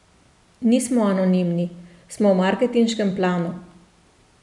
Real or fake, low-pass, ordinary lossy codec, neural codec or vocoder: real; 10.8 kHz; none; none